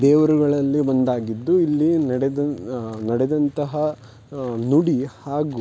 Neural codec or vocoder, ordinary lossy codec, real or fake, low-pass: none; none; real; none